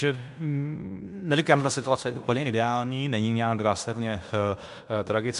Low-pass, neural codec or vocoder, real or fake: 10.8 kHz; codec, 16 kHz in and 24 kHz out, 0.9 kbps, LongCat-Audio-Codec, fine tuned four codebook decoder; fake